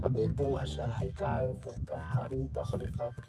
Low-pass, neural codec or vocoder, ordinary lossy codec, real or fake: none; codec, 24 kHz, 0.9 kbps, WavTokenizer, medium music audio release; none; fake